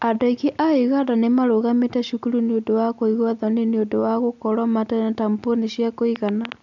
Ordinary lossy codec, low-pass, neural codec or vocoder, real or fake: none; 7.2 kHz; none; real